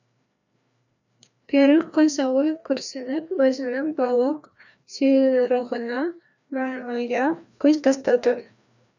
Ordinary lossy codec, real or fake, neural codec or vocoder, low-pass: none; fake; codec, 16 kHz, 1 kbps, FreqCodec, larger model; 7.2 kHz